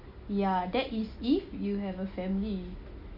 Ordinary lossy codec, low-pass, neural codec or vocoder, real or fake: none; 5.4 kHz; none; real